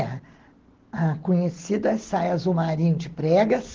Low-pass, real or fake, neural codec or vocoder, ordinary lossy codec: 7.2 kHz; real; none; Opus, 16 kbps